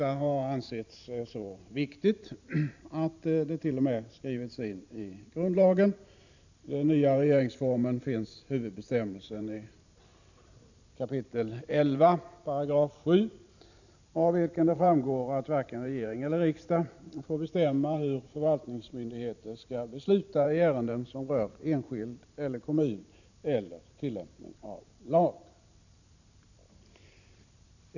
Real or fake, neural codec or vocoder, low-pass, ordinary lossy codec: fake; vocoder, 44.1 kHz, 128 mel bands every 512 samples, BigVGAN v2; 7.2 kHz; none